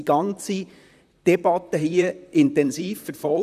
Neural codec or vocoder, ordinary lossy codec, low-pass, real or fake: vocoder, 44.1 kHz, 128 mel bands, Pupu-Vocoder; none; 14.4 kHz; fake